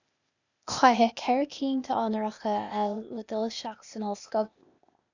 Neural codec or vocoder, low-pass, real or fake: codec, 16 kHz, 0.8 kbps, ZipCodec; 7.2 kHz; fake